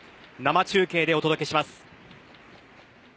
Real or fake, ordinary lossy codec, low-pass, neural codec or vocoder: real; none; none; none